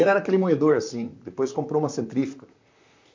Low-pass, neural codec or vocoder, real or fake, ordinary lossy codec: 7.2 kHz; vocoder, 44.1 kHz, 128 mel bands, Pupu-Vocoder; fake; MP3, 48 kbps